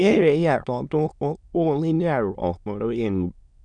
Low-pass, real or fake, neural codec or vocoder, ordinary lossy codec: 9.9 kHz; fake; autoencoder, 22.05 kHz, a latent of 192 numbers a frame, VITS, trained on many speakers; none